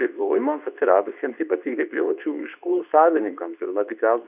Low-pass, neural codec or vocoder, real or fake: 3.6 kHz; codec, 24 kHz, 0.9 kbps, WavTokenizer, medium speech release version 2; fake